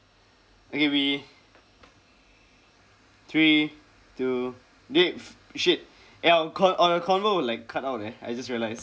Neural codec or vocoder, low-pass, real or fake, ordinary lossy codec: none; none; real; none